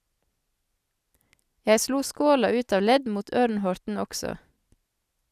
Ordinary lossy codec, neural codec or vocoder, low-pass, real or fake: none; none; 14.4 kHz; real